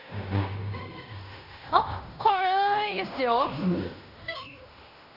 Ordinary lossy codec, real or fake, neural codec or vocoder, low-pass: none; fake; codec, 16 kHz in and 24 kHz out, 0.9 kbps, LongCat-Audio-Codec, fine tuned four codebook decoder; 5.4 kHz